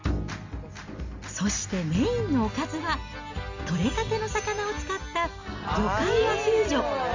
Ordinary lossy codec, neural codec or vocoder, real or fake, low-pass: MP3, 64 kbps; none; real; 7.2 kHz